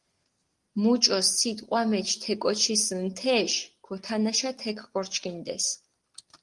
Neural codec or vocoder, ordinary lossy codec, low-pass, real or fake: none; Opus, 24 kbps; 10.8 kHz; real